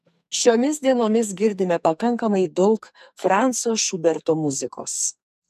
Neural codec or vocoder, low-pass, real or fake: codec, 44.1 kHz, 2.6 kbps, SNAC; 14.4 kHz; fake